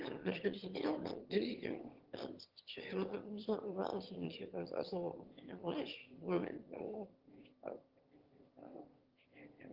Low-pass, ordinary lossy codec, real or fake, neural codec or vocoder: 5.4 kHz; Opus, 16 kbps; fake; autoencoder, 22.05 kHz, a latent of 192 numbers a frame, VITS, trained on one speaker